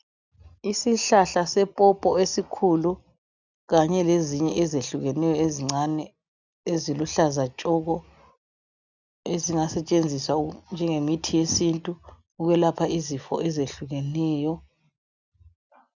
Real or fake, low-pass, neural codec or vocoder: real; 7.2 kHz; none